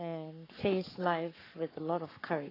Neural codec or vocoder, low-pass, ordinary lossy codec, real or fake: codec, 44.1 kHz, 7.8 kbps, Pupu-Codec; 5.4 kHz; AAC, 24 kbps; fake